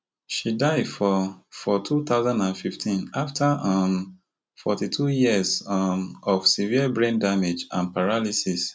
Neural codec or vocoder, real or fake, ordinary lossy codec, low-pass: none; real; none; none